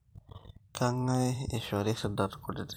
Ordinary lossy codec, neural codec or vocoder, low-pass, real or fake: none; vocoder, 44.1 kHz, 128 mel bands every 512 samples, BigVGAN v2; none; fake